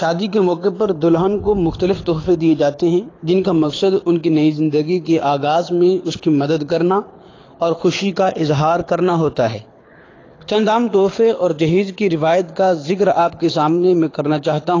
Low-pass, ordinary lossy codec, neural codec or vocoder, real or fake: 7.2 kHz; AAC, 32 kbps; codec, 24 kHz, 6 kbps, HILCodec; fake